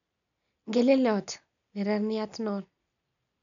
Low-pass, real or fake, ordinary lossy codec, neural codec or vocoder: 7.2 kHz; real; none; none